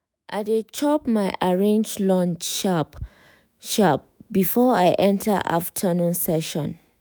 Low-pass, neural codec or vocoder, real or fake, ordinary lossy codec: none; autoencoder, 48 kHz, 128 numbers a frame, DAC-VAE, trained on Japanese speech; fake; none